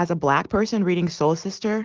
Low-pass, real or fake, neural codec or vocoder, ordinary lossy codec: 7.2 kHz; real; none; Opus, 16 kbps